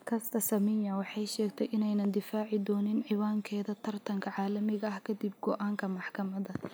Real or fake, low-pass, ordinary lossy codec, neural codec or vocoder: real; none; none; none